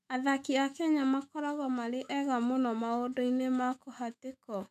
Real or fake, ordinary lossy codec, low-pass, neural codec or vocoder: fake; none; 14.4 kHz; autoencoder, 48 kHz, 128 numbers a frame, DAC-VAE, trained on Japanese speech